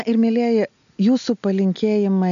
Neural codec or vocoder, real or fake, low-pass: none; real; 7.2 kHz